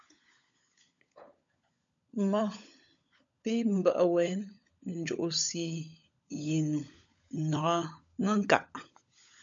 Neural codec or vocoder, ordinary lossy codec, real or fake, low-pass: codec, 16 kHz, 16 kbps, FunCodec, trained on LibriTTS, 50 frames a second; MP3, 64 kbps; fake; 7.2 kHz